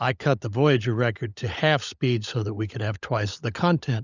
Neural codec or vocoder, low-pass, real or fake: codec, 16 kHz, 16 kbps, FunCodec, trained on LibriTTS, 50 frames a second; 7.2 kHz; fake